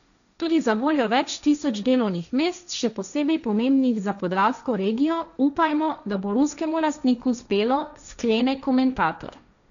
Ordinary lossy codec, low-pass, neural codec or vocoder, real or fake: none; 7.2 kHz; codec, 16 kHz, 1.1 kbps, Voila-Tokenizer; fake